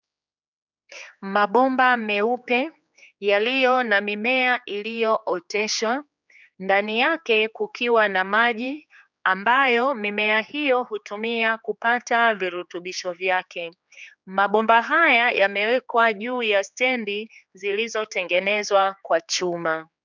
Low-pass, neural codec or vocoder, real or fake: 7.2 kHz; codec, 16 kHz, 4 kbps, X-Codec, HuBERT features, trained on general audio; fake